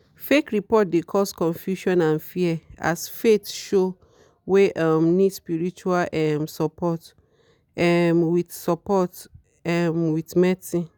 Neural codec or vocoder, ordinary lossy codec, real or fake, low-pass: none; none; real; none